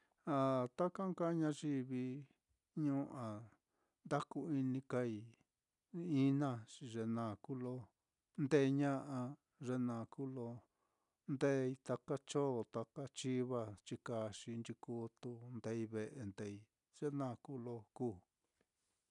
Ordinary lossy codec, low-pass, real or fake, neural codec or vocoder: none; 10.8 kHz; real; none